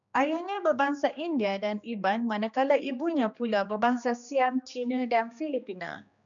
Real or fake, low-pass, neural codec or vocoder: fake; 7.2 kHz; codec, 16 kHz, 2 kbps, X-Codec, HuBERT features, trained on general audio